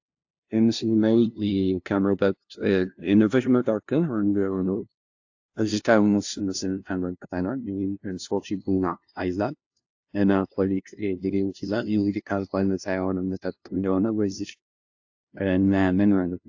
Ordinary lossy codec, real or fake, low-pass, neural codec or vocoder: AAC, 48 kbps; fake; 7.2 kHz; codec, 16 kHz, 0.5 kbps, FunCodec, trained on LibriTTS, 25 frames a second